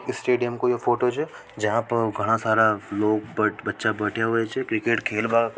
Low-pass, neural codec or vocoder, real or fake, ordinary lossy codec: none; none; real; none